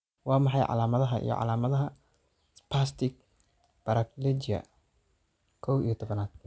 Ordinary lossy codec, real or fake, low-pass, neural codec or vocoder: none; real; none; none